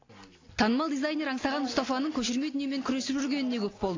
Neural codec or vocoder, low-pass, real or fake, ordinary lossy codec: none; 7.2 kHz; real; AAC, 32 kbps